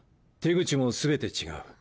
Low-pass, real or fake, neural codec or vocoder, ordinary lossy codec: none; real; none; none